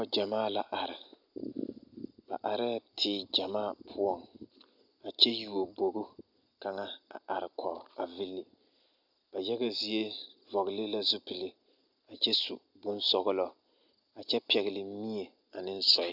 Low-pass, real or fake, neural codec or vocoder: 5.4 kHz; real; none